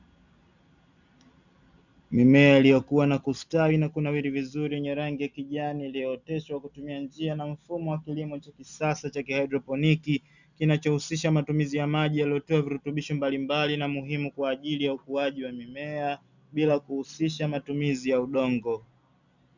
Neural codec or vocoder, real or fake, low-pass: none; real; 7.2 kHz